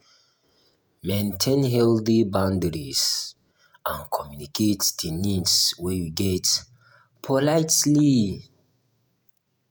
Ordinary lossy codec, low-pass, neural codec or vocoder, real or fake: none; none; vocoder, 48 kHz, 128 mel bands, Vocos; fake